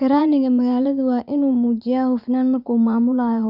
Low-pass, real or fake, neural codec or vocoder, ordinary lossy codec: 5.4 kHz; fake; vocoder, 44.1 kHz, 80 mel bands, Vocos; none